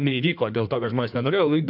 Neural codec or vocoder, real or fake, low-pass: codec, 16 kHz in and 24 kHz out, 1.1 kbps, FireRedTTS-2 codec; fake; 5.4 kHz